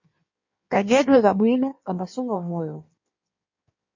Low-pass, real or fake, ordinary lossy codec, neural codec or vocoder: 7.2 kHz; fake; MP3, 32 kbps; codec, 16 kHz in and 24 kHz out, 1.1 kbps, FireRedTTS-2 codec